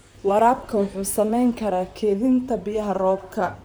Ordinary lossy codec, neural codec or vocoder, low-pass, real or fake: none; vocoder, 44.1 kHz, 128 mel bands, Pupu-Vocoder; none; fake